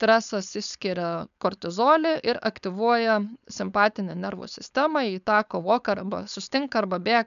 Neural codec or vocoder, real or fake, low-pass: codec, 16 kHz, 4.8 kbps, FACodec; fake; 7.2 kHz